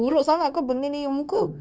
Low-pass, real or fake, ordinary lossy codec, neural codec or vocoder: none; fake; none; codec, 16 kHz, 0.9 kbps, LongCat-Audio-Codec